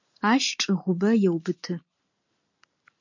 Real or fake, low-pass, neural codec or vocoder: real; 7.2 kHz; none